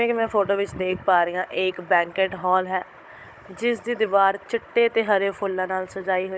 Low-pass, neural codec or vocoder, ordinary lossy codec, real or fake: none; codec, 16 kHz, 16 kbps, FunCodec, trained on Chinese and English, 50 frames a second; none; fake